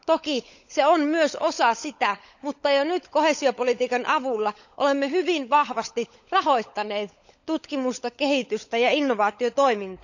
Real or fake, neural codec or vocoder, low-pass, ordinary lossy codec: fake; codec, 16 kHz, 16 kbps, FunCodec, trained on LibriTTS, 50 frames a second; 7.2 kHz; none